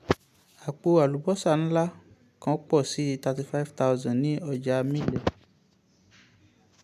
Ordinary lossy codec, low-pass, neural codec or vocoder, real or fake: MP3, 96 kbps; 14.4 kHz; none; real